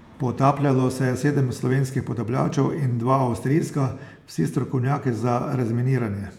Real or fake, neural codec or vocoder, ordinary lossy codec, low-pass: fake; vocoder, 48 kHz, 128 mel bands, Vocos; none; 19.8 kHz